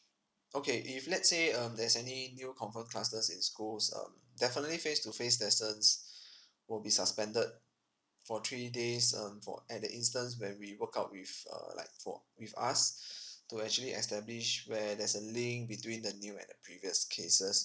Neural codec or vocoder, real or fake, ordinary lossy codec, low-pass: none; real; none; none